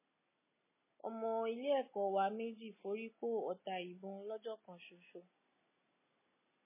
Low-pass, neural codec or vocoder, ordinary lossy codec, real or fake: 3.6 kHz; none; MP3, 16 kbps; real